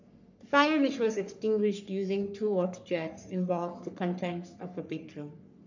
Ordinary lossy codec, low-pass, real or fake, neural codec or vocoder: none; 7.2 kHz; fake; codec, 44.1 kHz, 3.4 kbps, Pupu-Codec